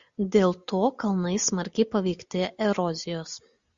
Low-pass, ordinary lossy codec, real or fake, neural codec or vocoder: 7.2 kHz; Opus, 64 kbps; real; none